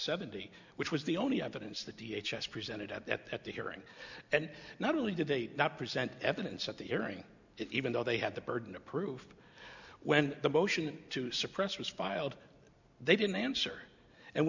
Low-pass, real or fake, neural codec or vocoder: 7.2 kHz; real; none